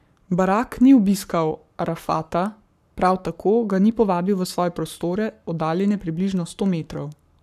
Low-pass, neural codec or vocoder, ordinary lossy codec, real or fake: 14.4 kHz; codec, 44.1 kHz, 7.8 kbps, Pupu-Codec; none; fake